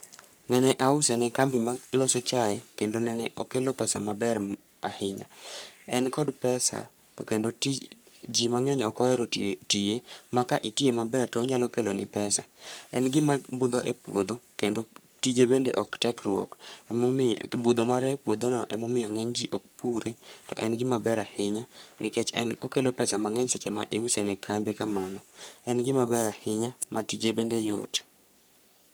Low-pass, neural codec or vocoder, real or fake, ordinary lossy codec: none; codec, 44.1 kHz, 3.4 kbps, Pupu-Codec; fake; none